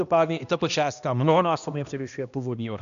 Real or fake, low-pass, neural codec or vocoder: fake; 7.2 kHz; codec, 16 kHz, 1 kbps, X-Codec, HuBERT features, trained on balanced general audio